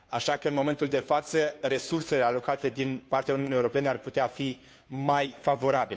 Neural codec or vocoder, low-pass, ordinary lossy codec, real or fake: codec, 16 kHz, 2 kbps, FunCodec, trained on Chinese and English, 25 frames a second; none; none; fake